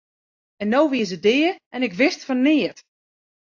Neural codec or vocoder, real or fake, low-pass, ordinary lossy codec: none; real; 7.2 kHz; AAC, 48 kbps